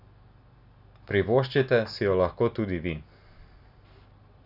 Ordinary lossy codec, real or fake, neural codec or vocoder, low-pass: none; real; none; 5.4 kHz